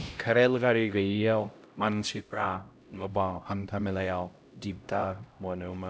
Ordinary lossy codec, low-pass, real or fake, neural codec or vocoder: none; none; fake; codec, 16 kHz, 0.5 kbps, X-Codec, HuBERT features, trained on LibriSpeech